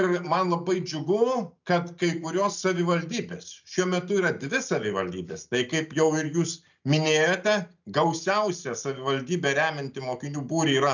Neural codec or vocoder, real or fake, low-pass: none; real; 7.2 kHz